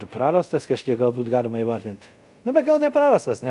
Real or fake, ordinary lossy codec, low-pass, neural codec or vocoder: fake; MP3, 96 kbps; 10.8 kHz; codec, 24 kHz, 0.5 kbps, DualCodec